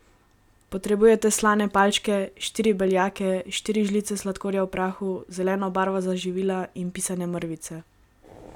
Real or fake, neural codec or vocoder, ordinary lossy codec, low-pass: real; none; none; 19.8 kHz